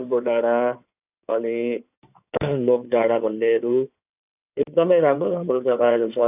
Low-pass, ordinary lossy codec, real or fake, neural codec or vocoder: 3.6 kHz; none; fake; codec, 16 kHz in and 24 kHz out, 2.2 kbps, FireRedTTS-2 codec